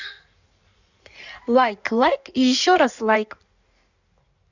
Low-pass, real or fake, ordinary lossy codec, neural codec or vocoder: 7.2 kHz; fake; none; codec, 16 kHz in and 24 kHz out, 1.1 kbps, FireRedTTS-2 codec